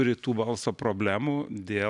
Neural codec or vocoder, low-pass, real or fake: none; 10.8 kHz; real